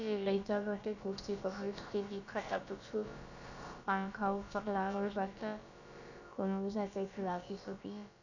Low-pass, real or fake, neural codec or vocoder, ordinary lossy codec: 7.2 kHz; fake; codec, 16 kHz, about 1 kbps, DyCAST, with the encoder's durations; none